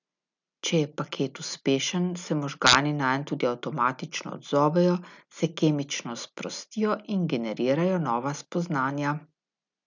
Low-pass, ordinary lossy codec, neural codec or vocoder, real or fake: 7.2 kHz; none; none; real